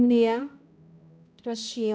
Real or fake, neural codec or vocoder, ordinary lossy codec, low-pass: fake; codec, 16 kHz, 0.5 kbps, X-Codec, HuBERT features, trained on balanced general audio; none; none